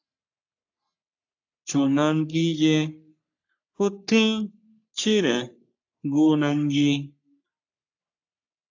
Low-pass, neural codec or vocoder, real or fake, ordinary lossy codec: 7.2 kHz; codec, 44.1 kHz, 3.4 kbps, Pupu-Codec; fake; AAC, 48 kbps